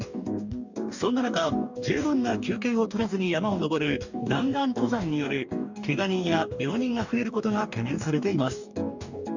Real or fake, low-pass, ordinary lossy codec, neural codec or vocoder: fake; 7.2 kHz; none; codec, 44.1 kHz, 2.6 kbps, DAC